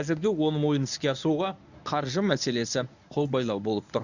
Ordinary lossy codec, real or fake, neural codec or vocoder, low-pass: none; fake; codec, 24 kHz, 0.9 kbps, WavTokenizer, medium speech release version 1; 7.2 kHz